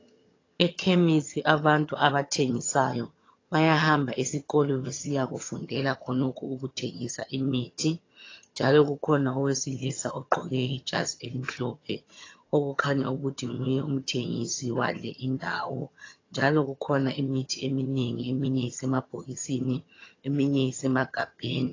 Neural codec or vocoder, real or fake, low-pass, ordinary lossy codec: vocoder, 22.05 kHz, 80 mel bands, HiFi-GAN; fake; 7.2 kHz; AAC, 32 kbps